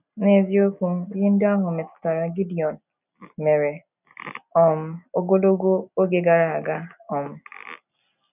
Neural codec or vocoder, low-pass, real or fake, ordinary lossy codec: none; 3.6 kHz; real; none